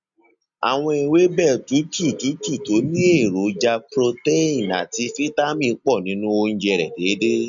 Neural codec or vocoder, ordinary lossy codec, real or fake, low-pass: none; none; real; 7.2 kHz